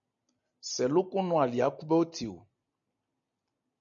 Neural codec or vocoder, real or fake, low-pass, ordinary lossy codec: none; real; 7.2 kHz; MP3, 64 kbps